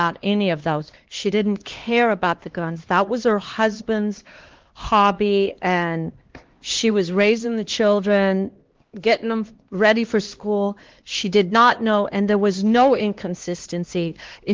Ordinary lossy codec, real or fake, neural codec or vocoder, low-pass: Opus, 16 kbps; fake; codec, 16 kHz, 2 kbps, X-Codec, HuBERT features, trained on LibriSpeech; 7.2 kHz